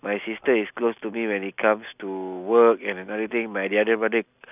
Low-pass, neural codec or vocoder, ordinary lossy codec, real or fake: 3.6 kHz; none; none; real